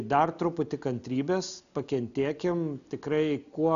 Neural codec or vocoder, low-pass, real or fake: none; 7.2 kHz; real